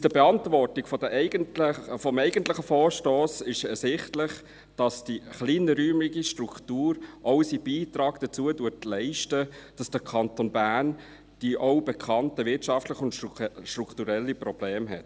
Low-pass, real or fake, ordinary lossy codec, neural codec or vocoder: none; real; none; none